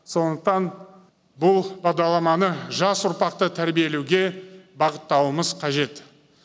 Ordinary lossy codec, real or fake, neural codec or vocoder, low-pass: none; real; none; none